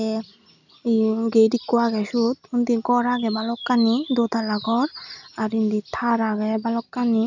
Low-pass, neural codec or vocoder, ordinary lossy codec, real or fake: 7.2 kHz; none; none; real